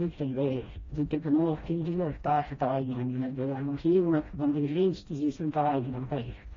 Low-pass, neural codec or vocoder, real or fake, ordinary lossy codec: 7.2 kHz; codec, 16 kHz, 1 kbps, FreqCodec, smaller model; fake; AAC, 32 kbps